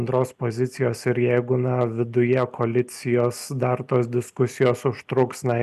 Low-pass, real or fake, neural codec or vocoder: 14.4 kHz; real; none